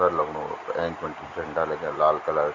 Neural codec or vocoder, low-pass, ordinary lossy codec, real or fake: none; 7.2 kHz; none; real